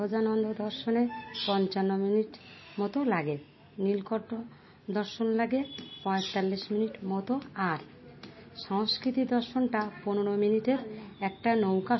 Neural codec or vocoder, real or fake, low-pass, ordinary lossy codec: none; real; 7.2 kHz; MP3, 24 kbps